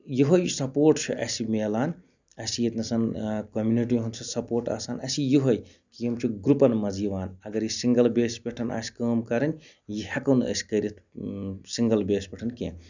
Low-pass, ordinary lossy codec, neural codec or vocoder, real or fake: 7.2 kHz; none; none; real